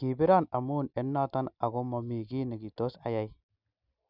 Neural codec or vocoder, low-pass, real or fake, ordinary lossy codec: none; 5.4 kHz; real; none